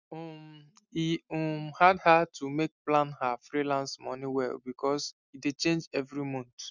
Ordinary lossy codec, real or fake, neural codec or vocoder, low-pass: none; real; none; 7.2 kHz